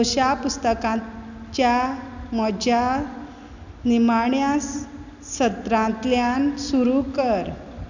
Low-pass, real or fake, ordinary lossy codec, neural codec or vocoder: 7.2 kHz; real; none; none